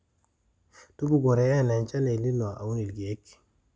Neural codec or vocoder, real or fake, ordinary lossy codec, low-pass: none; real; none; none